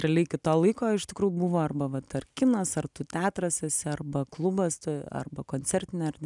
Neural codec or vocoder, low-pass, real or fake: none; 10.8 kHz; real